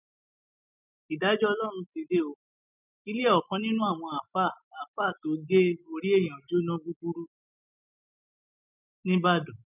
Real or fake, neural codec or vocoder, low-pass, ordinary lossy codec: real; none; 3.6 kHz; none